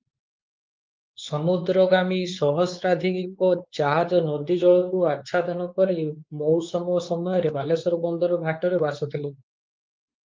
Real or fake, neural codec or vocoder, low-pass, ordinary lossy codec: fake; codec, 16 kHz, 4 kbps, X-Codec, WavLM features, trained on Multilingual LibriSpeech; 7.2 kHz; Opus, 32 kbps